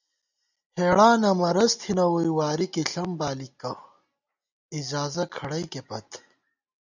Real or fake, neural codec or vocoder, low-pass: real; none; 7.2 kHz